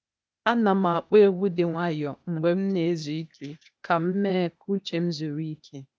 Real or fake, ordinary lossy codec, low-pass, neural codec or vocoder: fake; none; none; codec, 16 kHz, 0.8 kbps, ZipCodec